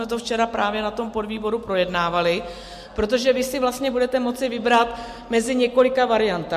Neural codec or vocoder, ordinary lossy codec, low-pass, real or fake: none; MP3, 64 kbps; 14.4 kHz; real